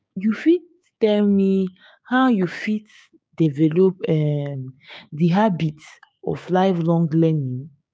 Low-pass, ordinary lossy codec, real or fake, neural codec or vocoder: none; none; fake; codec, 16 kHz, 6 kbps, DAC